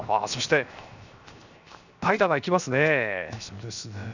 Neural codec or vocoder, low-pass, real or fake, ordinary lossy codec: codec, 16 kHz, 0.7 kbps, FocalCodec; 7.2 kHz; fake; none